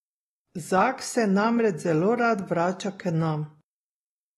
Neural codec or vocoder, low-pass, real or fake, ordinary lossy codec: none; 19.8 kHz; real; AAC, 32 kbps